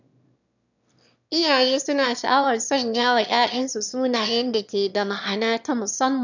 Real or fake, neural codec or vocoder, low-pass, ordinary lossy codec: fake; autoencoder, 22.05 kHz, a latent of 192 numbers a frame, VITS, trained on one speaker; 7.2 kHz; none